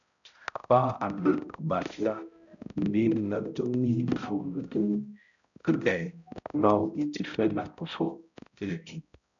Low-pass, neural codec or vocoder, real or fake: 7.2 kHz; codec, 16 kHz, 0.5 kbps, X-Codec, HuBERT features, trained on balanced general audio; fake